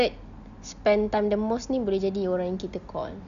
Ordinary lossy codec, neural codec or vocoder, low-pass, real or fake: none; none; 7.2 kHz; real